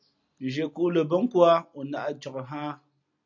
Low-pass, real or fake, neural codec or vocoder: 7.2 kHz; real; none